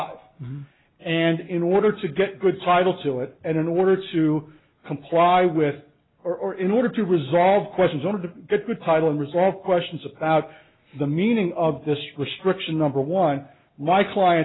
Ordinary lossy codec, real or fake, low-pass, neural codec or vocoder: AAC, 16 kbps; real; 7.2 kHz; none